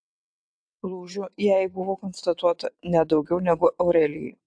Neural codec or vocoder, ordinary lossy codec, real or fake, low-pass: vocoder, 22.05 kHz, 80 mel bands, WaveNeXt; AAC, 64 kbps; fake; 9.9 kHz